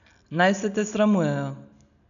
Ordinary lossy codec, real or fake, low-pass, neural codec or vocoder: none; real; 7.2 kHz; none